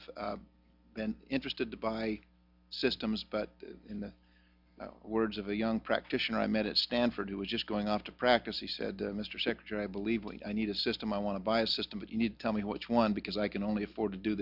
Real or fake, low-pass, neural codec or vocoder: real; 5.4 kHz; none